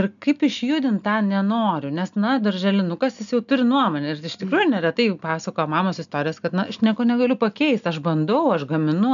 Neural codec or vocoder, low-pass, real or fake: none; 7.2 kHz; real